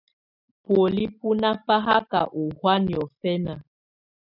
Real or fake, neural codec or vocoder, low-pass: real; none; 5.4 kHz